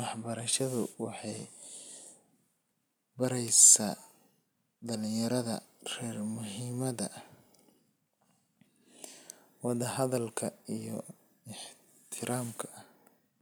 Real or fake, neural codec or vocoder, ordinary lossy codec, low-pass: real; none; none; none